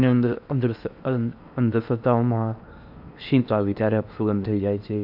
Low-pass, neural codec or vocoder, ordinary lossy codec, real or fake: 5.4 kHz; codec, 16 kHz in and 24 kHz out, 0.8 kbps, FocalCodec, streaming, 65536 codes; none; fake